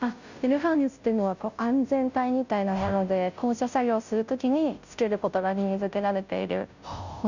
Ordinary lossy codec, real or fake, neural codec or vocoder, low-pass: none; fake; codec, 16 kHz, 0.5 kbps, FunCodec, trained on Chinese and English, 25 frames a second; 7.2 kHz